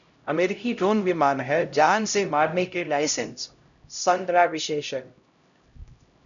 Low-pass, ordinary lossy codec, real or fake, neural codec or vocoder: 7.2 kHz; MP3, 96 kbps; fake; codec, 16 kHz, 0.5 kbps, X-Codec, HuBERT features, trained on LibriSpeech